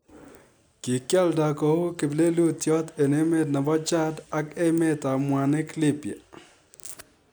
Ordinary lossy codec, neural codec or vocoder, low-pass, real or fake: none; none; none; real